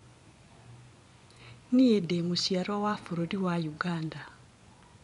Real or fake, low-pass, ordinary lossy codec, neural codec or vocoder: fake; 10.8 kHz; none; vocoder, 24 kHz, 100 mel bands, Vocos